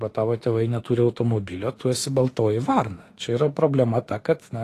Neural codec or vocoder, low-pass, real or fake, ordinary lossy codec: autoencoder, 48 kHz, 32 numbers a frame, DAC-VAE, trained on Japanese speech; 14.4 kHz; fake; AAC, 48 kbps